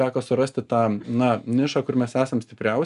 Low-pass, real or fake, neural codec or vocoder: 10.8 kHz; real; none